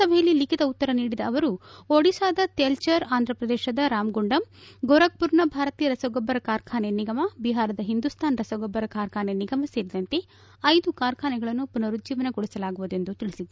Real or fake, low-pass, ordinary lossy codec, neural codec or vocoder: real; none; none; none